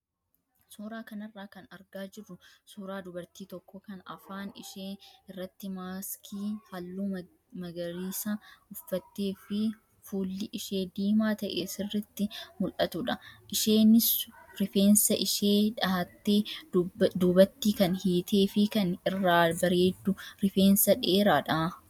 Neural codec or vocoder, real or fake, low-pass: none; real; 19.8 kHz